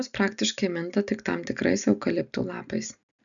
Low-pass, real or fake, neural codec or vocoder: 7.2 kHz; real; none